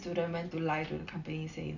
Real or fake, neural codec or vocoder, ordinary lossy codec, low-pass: real; none; none; 7.2 kHz